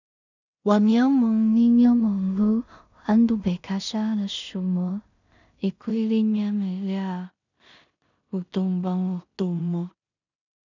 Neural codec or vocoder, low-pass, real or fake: codec, 16 kHz in and 24 kHz out, 0.4 kbps, LongCat-Audio-Codec, two codebook decoder; 7.2 kHz; fake